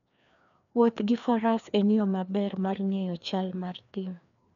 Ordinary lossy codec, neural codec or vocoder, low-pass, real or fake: none; codec, 16 kHz, 2 kbps, FreqCodec, larger model; 7.2 kHz; fake